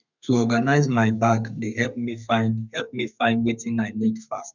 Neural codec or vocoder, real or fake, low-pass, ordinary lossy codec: codec, 32 kHz, 1.9 kbps, SNAC; fake; 7.2 kHz; none